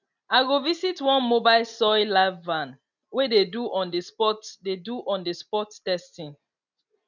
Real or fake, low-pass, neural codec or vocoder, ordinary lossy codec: real; 7.2 kHz; none; none